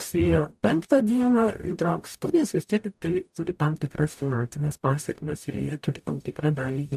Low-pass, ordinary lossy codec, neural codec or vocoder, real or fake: 14.4 kHz; AAC, 96 kbps; codec, 44.1 kHz, 0.9 kbps, DAC; fake